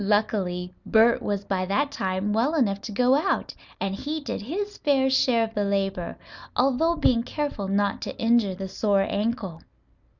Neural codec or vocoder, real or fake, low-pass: none; real; 7.2 kHz